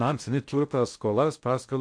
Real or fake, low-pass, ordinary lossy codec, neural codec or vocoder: fake; 9.9 kHz; MP3, 64 kbps; codec, 16 kHz in and 24 kHz out, 0.6 kbps, FocalCodec, streaming, 2048 codes